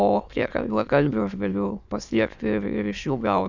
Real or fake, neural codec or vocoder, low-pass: fake; autoencoder, 22.05 kHz, a latent of 192 numbers a frame, VITS, trained on many speakers; 7.2 kHz